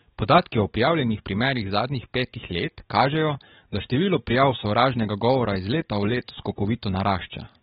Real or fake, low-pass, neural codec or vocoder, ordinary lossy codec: fake; 19.8 kHz; codec, 44.1 kHz, 7.8 kbps, DAC; AAC, 16 kbps